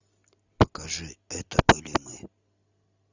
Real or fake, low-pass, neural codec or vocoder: real; 7.2 kHz; none